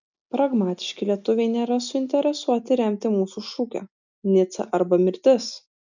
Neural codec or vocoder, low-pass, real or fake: none; 7.2 kHz; real